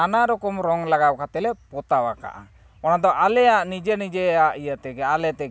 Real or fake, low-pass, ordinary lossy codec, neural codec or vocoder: real; none; none; none